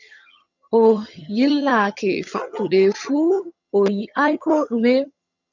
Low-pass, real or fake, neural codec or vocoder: 7.2 kHz; fake; vocoder, 22.05 kHz, 80 mel bands, HiFi-GAN